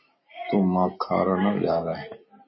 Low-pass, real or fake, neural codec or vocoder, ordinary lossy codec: 7.2 kHz; real; none; MP3, 24 kbps